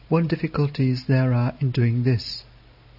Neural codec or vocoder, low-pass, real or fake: none; 5.4 kHz; real